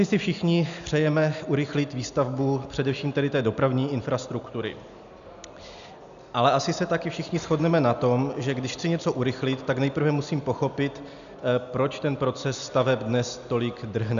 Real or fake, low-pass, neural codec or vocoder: real; 7.2 kHz; none